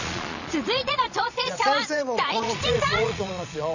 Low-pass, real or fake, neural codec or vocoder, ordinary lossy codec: 7.2 kHz; fake; vocoder, 22.05 kHz, 80 mel bands, Vocos; none